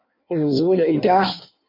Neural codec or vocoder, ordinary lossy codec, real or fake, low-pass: codec, 16 kHz in and 24 kHz out, 1.1 kbps, FireRedTTS-2 codec; MP3, 32 kbps; fake; 5.4 kHz